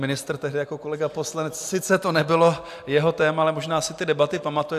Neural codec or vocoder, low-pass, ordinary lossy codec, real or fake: none; 14.4 kHz; AAC, 96 kbps; real